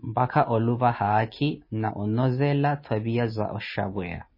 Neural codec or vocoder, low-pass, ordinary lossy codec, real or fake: none; 5.4 kHz; MP3, 24 kbps; real